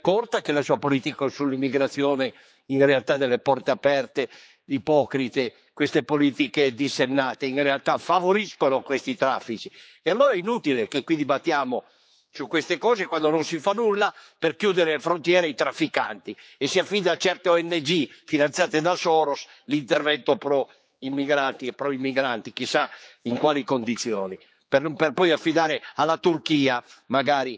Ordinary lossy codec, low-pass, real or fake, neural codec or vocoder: none; none; fake; codec, 16 kHz, 4 kbps, X-Codec, HuBERT features, trained on general audio